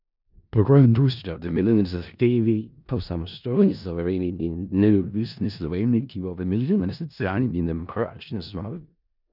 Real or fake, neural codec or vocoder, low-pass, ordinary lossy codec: fake; codec, 16 kHz in and 24 kHz out, 0.4 kbps, LongCat-Audio-Codec, four codebook decoder; 5.4 kHz; none